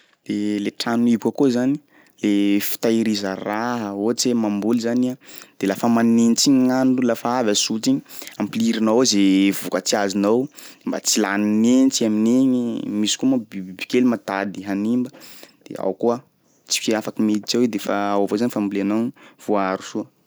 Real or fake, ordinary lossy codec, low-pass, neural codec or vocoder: real; none; none; none